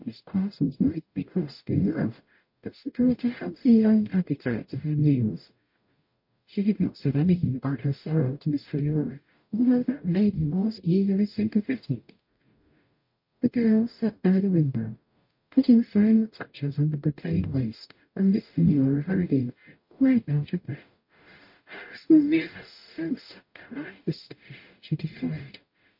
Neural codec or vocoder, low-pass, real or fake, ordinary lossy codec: codec, 44.1 kHz, 0.9 kbps, DAC; 5.4 kHz; fake; MP3, 32 kbps